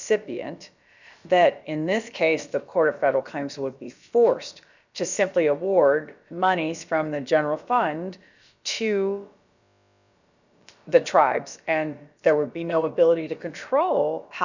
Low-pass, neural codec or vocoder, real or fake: 7.2 kHz; codec, 16 kHz, about 1 kbps, DyCAST, with the encoder's durations; fake